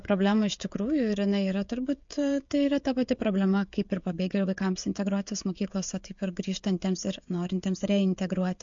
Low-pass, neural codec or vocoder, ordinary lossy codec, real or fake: 7.2 kHz; codec, 16 kHz, 16 kbps, FreqCodec, smaller model; MP3, 48 kbps; fake